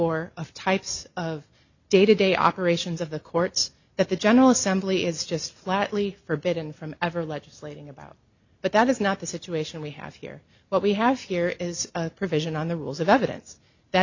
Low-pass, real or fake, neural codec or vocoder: 7.2 kHz; real; none